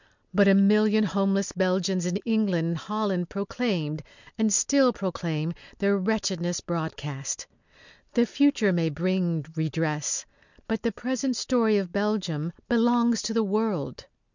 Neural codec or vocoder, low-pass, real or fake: none; 7.2 kHz; real